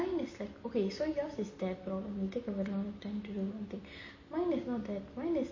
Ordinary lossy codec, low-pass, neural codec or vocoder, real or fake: MP3, 32 kbps; 7.2 kHz; none; real